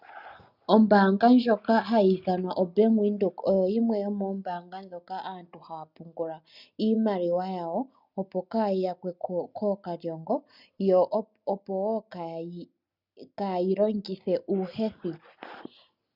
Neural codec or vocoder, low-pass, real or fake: none; 5.4 kHz; real